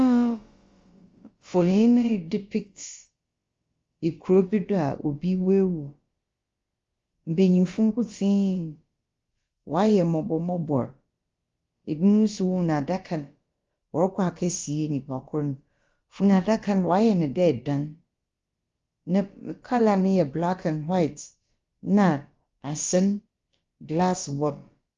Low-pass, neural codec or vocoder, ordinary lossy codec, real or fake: 7.2 kHz; codec, 16 kHz, about 1 kbps, DyCAST, with the encoder's durations; Opus, 32 kbps; fake